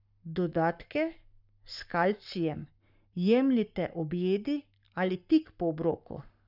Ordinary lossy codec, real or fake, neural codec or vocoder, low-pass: none; fake; codec, 44.1 kHz, 7.8 kbps, Pupu-Codec; 5.4 kHz